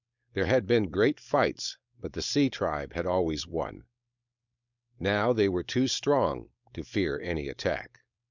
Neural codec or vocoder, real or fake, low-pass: codec, 16 kHz, 4.8 kbps, FACodec; fake; 7.2 kHz